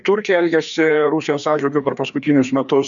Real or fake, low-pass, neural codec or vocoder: fake; 7.2 kHz; codec, 16 kHz, 2 kbps, FreqCodec, larger model